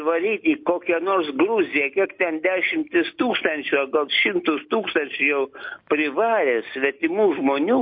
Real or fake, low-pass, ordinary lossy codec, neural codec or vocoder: real; 5.4 kHz; MP3, 32 kbps; none